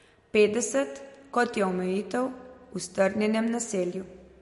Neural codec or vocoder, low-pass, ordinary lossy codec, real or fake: none; 14.4 kHz; MP3, 48 kbps; real